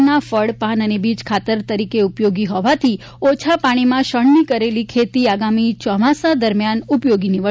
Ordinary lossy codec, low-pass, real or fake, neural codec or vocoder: none; none; real; none